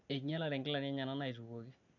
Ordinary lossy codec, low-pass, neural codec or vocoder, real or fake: none; 7.2 kHz; none; real